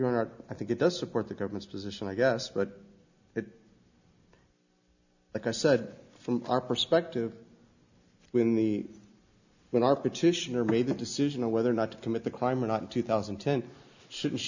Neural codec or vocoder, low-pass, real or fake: none; 7.2 kHz; real